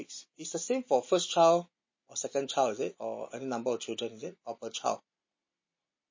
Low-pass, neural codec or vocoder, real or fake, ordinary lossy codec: 7.2 kHz; none; real; MP3, 32 kbps